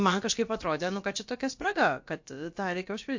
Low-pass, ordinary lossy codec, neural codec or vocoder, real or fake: 7.2 kHz; MP3, 48 kbps; codec, 16 kHz, about 1 kbps, DyCAST, with the encoder's durations; fake